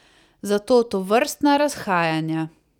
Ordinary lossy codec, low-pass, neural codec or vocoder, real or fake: none; 19.8 kHz; vocoder, 44.1 kHz, 128 mel bands every 256 samples, BigVGAN v2; fake